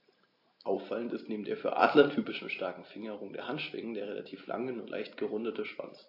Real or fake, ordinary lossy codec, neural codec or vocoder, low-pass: real; AAC, 32 kbps; none; 5.4 kHz